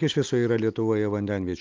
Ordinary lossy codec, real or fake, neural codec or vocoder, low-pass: Opus, 24 kbps; real; none; 7.2 kHz